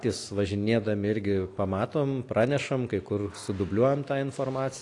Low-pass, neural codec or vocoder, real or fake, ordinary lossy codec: 10.8 kHz; none; real; AAC, 48 kbps